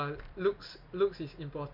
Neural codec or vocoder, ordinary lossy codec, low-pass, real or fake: none; MP3, 48 kbps; 5.4 kHz; real